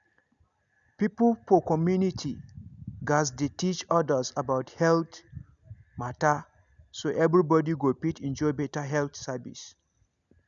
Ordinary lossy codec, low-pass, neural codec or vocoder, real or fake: none; 7.2 kHz; none; real